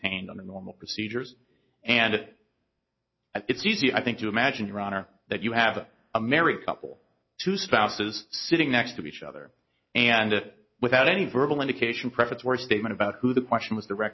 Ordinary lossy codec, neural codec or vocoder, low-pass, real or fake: MP3, 24 kbps; none; 7.2 kHz; real